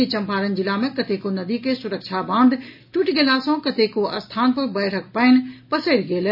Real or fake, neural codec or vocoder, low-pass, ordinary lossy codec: real; none; 5.4 kHz; none